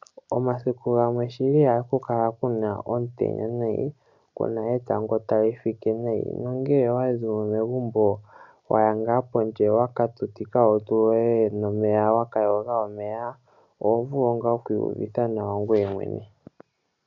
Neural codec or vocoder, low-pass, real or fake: none; 7.2 kHz; real